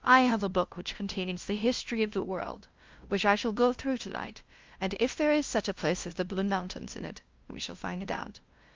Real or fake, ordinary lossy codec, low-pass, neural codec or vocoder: fake; Opus, 24 kbps; 7.2 kHz; codec, 16 kHz, 0.5 kbps, FunCodec, trained on LibriTTS, 25 frames a second